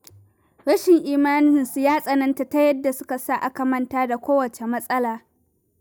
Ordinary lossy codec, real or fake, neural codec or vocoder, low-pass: none; real; none; none